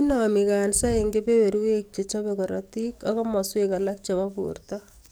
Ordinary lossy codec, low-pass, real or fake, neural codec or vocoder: none; none; fake; codec, 44.1 kHz, 7.8 kbps, DAC